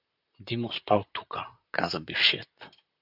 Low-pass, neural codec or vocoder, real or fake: 5.4 kHz; vocoder, 44.1 kHz, 128 mel bands, Pupu-Vocoder; fake